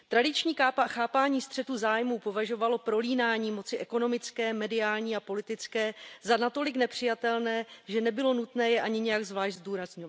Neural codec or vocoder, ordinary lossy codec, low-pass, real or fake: none; none; none; real